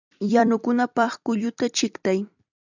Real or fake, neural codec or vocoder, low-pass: fake; vocoder, 44.1 kHz, 128 mel bands every 256 samples, BigVGAN v2; 7.2 kHz